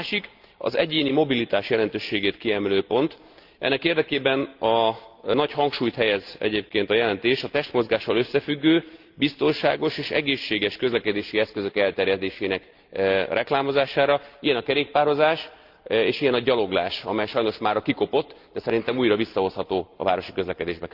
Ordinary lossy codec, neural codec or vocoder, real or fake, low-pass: Opus, 32 kbps; none; real; 5.4 kHz